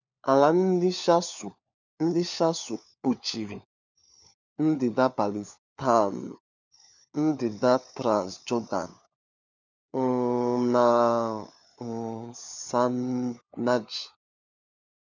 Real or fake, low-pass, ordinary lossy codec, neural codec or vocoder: fake; 7.2 kHz; none; codec, 16 kHz, 4 kbps, FunCodec, trained on LibriTTS, 50 frames a second